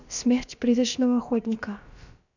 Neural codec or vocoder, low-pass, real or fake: codec, 16 kHz, about 1 kbps, DyCAST, with the encoder's durations; 7.2 kHz; fake